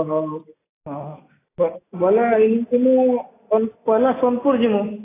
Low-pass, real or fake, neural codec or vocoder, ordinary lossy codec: 3.6 kHz; real; none; AAC, 16 kbps